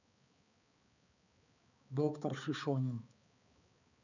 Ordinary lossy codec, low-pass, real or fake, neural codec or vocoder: none; 7.2 kHz; fake; codec, 16 kHz, 4 kbps, X-Codec, HuBERT features, trained on balanced general audio